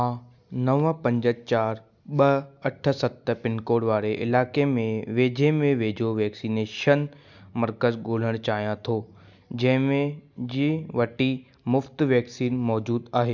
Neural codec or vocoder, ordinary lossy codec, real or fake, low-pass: none; none; real; 7.2 kHz